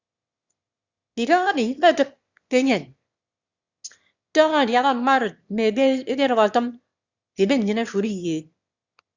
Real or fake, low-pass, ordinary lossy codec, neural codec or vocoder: fake; 7.2 kHz; Opus, 64 kbps; autoencoder, 22.05 kHz, a latent of 192 numbers a frame, VITS, trained on one speaker